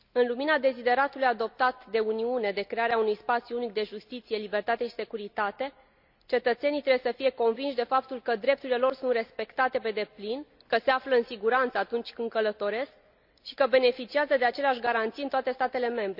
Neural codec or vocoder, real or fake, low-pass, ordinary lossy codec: none; real; 5.4 kHz; none